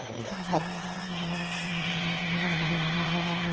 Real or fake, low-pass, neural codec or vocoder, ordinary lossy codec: fake; 7.2 kHz; autoencoder, 22.05 kHz, a latent of 192 numbers a frame, VITS, trained on one speaker; Opus, 16 kbps